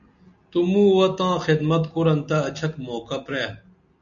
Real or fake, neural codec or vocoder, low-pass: real; none; 7.2 kHz